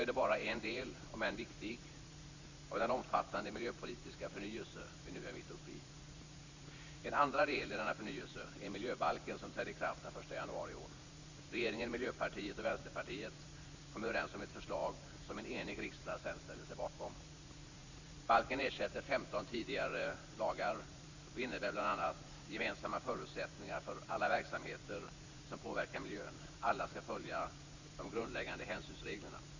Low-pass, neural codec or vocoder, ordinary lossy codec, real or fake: 7.2 kHz; vocoder, 44.1 kHz, 80 mel bands, Vocos; Opus, 64 kbps; fake